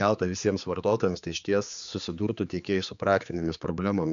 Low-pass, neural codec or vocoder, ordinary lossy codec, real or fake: 7.2 kHz; codec, 16 kHz, 4 kbps, X-Codec, HuBERT features, trained on general audio; MP3, 96 kbps; fake